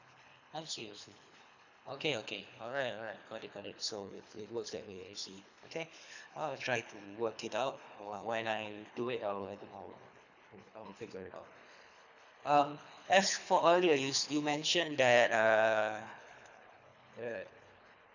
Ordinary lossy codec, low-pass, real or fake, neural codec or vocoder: none; 7.2 kHz; fake; codec, 24 kHz, 3 kbps, HILCodec